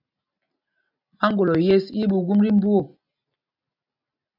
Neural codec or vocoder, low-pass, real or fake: none; 5.4 kHz; real